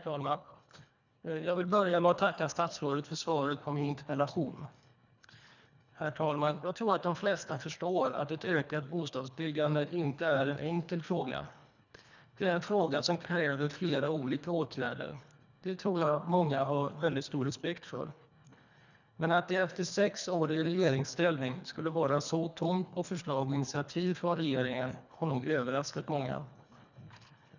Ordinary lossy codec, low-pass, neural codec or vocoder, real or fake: none; 7.2 kHz; codec, 24 kHz, 1.5 kbps, HILCodec; fake